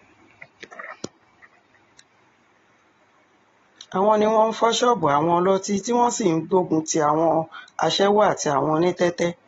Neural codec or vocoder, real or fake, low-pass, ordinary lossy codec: none; real; 7.2 kHz; AAC, 24 kbps